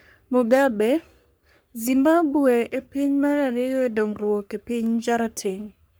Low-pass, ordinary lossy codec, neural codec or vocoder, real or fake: none; none; codec, 44.1 kHz, 3.4 kbps, Pupu-Codec; fake